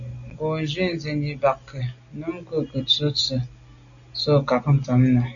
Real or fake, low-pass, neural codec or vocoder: real; 7.2 kHz; none